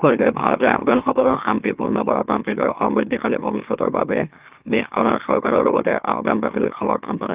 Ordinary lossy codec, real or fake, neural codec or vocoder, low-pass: Opus, 32 kbps; fake; autoencoder, 44.1 kHz, a latent of 192 numbers a frame, MeloTTS; 3.6 kHz